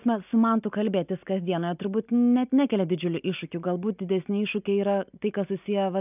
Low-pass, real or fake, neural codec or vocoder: 3.6 kHz; real; none